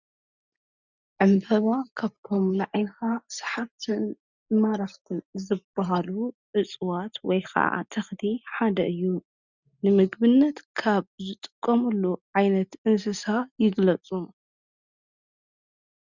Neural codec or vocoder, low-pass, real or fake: none; 7.2 kHz; real